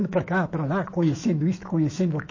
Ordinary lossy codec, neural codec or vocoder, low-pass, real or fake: MP3, 32 kbps; autoencoder, 48 kHz, 128 numbers a frame, DAC-VAE, trained on Japanese speech; 7.2 kHz; fake